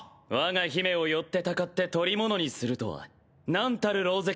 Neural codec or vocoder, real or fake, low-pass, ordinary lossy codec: none; real; none; none